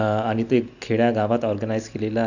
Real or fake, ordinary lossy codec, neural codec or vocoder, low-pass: real; none; none; 7.2 kHz